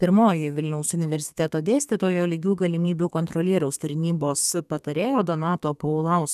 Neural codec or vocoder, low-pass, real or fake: codec, 32 kHz, 1.9 kbps, SNAC; 14.4 kHz; fake